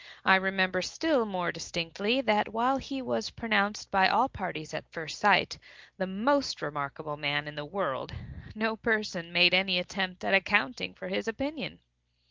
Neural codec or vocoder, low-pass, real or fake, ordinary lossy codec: none; 7.2 kHz; real; Opus, 32 kbps